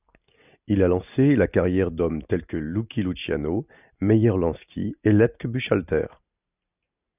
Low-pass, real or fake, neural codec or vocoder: 3.6 kHz; real; none